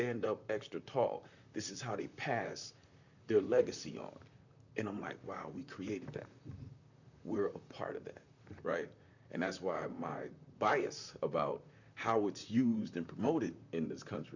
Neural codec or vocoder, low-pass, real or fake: vocoder, 44.1 kHz, 128 mel bands, Pupu-Vocoder; 7.2 kHz; fake